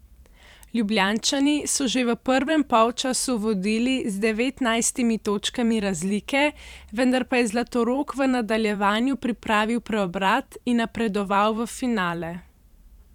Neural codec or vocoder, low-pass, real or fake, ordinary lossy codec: vocoder, 44.1 kHz, 128 mel bands every 512 samples, BigVGAN v2; 19.8 kHz; fake; none